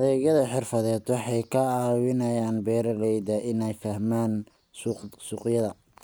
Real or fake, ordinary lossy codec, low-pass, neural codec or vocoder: real; none; none; none